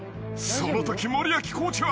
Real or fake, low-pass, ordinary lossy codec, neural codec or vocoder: real; none; none; none